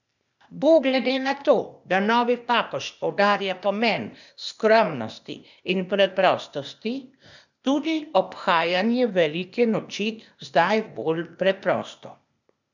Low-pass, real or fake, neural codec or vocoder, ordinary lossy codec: 7.2 kHz; fake; codec, 16 kHz, 0.8 kbps, ZipCodec; none